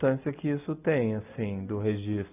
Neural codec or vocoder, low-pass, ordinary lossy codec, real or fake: none; 3.6 kHz; none; real